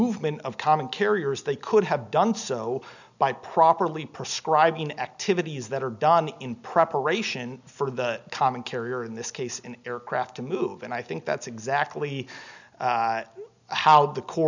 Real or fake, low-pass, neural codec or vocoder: real; 7.2 kHz; none